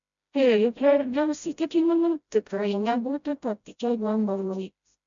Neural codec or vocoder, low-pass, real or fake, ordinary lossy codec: codec, 16 kHz, 0.5 kbps, FreqCodec, smaller model; 7.2 kHz; fake; MP3, 64 kbps